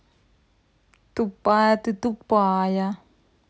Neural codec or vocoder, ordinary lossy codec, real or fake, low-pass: none; none; real; none